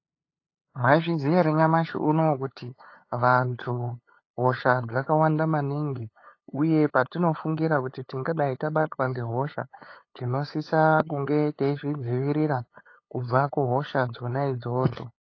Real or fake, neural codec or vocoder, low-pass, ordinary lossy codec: fake; codec, 16 kHz, 8 kbps, FunCodec, trained on LibriTTS, 25 frames a second; 7.2 kHz; AAC, 32 kbps